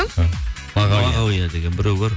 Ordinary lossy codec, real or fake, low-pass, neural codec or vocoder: none; real; none; none